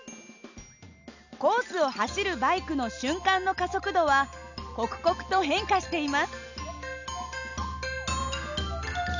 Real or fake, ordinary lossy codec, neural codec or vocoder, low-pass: real; none; none; 7.2 kHz